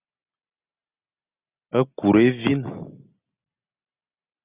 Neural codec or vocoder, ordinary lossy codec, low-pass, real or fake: none; Opus, 64 kbps; 3.6 kHz; real